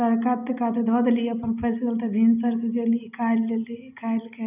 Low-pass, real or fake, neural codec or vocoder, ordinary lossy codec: 3.6 kHz; real; none; none